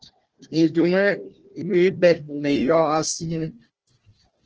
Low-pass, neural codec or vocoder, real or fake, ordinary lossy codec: 7.2 kHz; codec, 16 kHz, 1 kbps, FunCodec, trained on Chinese and English, 50 frames a second; fake; Opus, 16 kbps